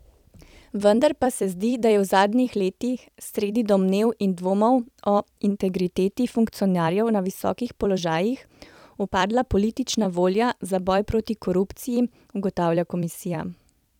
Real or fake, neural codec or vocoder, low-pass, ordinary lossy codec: fake; vocoder, 44.1 kHz, 128 mel bands every 256 samples, BigVGAN v2; 19.8 kHz; none